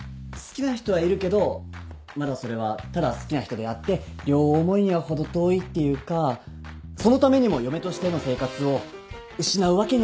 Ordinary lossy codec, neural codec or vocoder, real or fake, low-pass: none; none; real; none